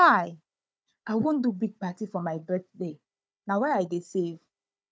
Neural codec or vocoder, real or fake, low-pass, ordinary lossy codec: codec, 16 kHz, 4 kbps, FunCodec, trained on Chinese and English, 50 frames a second; fake; none; none